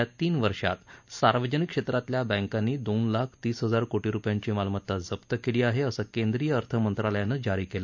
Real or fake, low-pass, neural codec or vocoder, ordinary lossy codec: real; 7.2 kHz; none; none